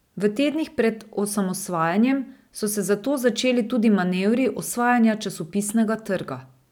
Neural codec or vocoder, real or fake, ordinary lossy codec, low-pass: none; real; none; 19.8 kHz